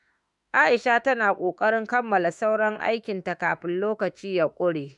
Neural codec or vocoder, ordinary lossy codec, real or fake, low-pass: autoencoder, 48 kHz, 32 numbers a frame, DAC-VAE, trained on Japanese speech; none; fake; 10.8 kHz